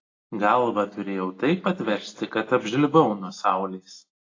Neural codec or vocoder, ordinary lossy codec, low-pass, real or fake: none; AAC, 32 kbps; 7.2 kHz; real